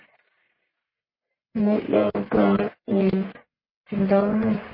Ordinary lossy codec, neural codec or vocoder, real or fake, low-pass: MP3, 24 kbps; codec, 44.1 kHz, 1.7 kbps, Pupu-Codec; fake; 5.4 kHz